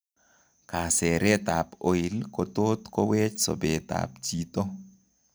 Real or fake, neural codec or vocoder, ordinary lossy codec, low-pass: real; none; none; none